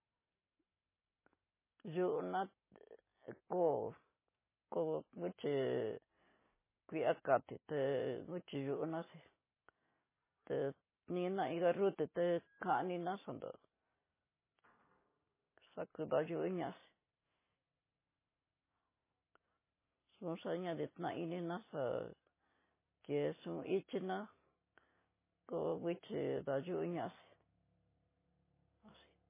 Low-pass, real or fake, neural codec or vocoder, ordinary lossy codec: 3.6 kHz; real; none; MP3, 16 kbps